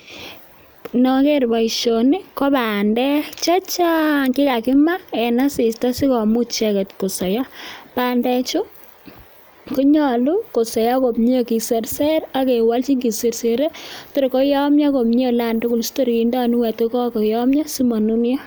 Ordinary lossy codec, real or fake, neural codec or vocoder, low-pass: none; real; none; none